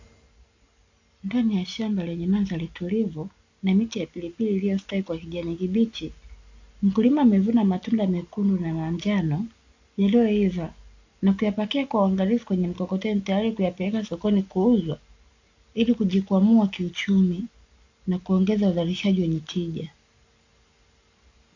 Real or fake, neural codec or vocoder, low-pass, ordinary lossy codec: real; none; 7.2 kHz; AAC, 48 kbps